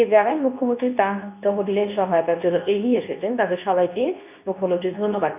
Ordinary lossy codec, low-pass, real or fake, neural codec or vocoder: none; 3.6 kHz; fake; codec, 24 kHz, 0.9 kbps, WavTokenizer, medium speech release version 2